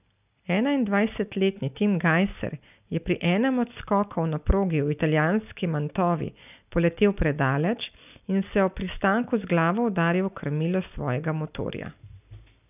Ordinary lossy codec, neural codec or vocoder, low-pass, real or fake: none; none; 3.6 kHz; real